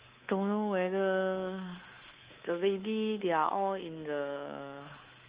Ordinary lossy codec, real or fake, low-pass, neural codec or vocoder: Opus, 24 kbps; fake; 3.6 kHz; codec, 24 kHz, 3.1 kbps, DualCodec